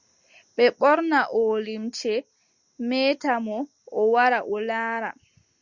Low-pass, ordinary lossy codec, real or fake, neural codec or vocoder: 7.2 kHz; Opus, 64 kbps; real; none